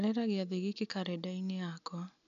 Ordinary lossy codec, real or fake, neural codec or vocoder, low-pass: none; real; none; 7.2 kHz